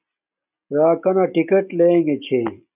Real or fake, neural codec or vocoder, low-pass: real; none; 3.6 kHz